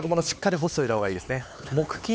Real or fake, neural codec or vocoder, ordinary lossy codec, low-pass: fake; codec, 16 kHz, 4 kbps, X-Codec, HuBERT features, trained on LibriSpeech; none; none